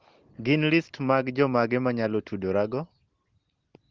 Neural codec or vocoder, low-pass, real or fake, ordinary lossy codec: none; 7.2 kHz; real; Opus, 16 kbps